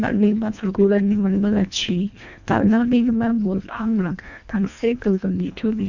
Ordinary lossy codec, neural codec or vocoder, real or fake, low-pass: none; codec, 24 kHz, 1.5 kbps, HILCodec; fake; 7.2 kHz